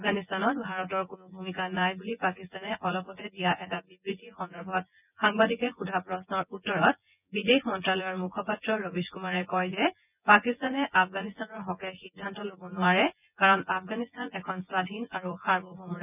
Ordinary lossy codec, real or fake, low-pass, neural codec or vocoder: none; fake; 3.6 kHz; vocoder, 24 kHz, 100 mel bands, Vocos